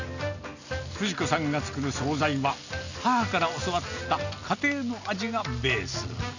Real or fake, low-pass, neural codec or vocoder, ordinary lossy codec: real; 7.2 kHz; none; none